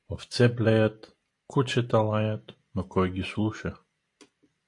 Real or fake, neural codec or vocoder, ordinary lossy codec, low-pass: real; none; AAC, 48 kbps; 10.8 kHz